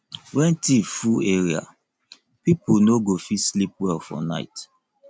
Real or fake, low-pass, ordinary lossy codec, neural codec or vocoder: real; none; none; none